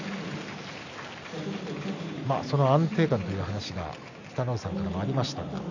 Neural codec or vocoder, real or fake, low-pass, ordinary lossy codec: none; real; 7.2 kHz; none